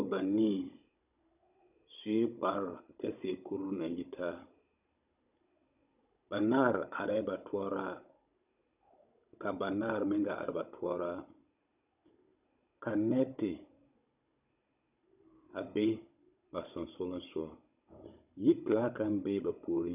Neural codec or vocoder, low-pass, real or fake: codec, 16 kHz, 16 kbps, FunCodec, trained on Chinese and English, 50 frames a second; 3.6 kHz; fake